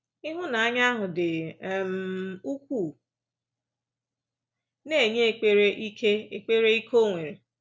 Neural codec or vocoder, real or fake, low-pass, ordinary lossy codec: none; real; none; none